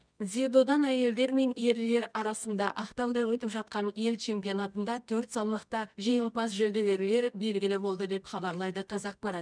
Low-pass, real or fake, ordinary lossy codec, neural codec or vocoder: 9.9 kHz; fake; none; codec, 24 kHz, 0.9 kbps, WavTokenizer, medium music audio release